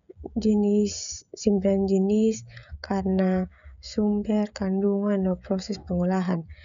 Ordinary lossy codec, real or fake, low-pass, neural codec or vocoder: none; fake; 7.2 kHz; codec, 16 kHz, 16 kbps, FreqCodec, smaller model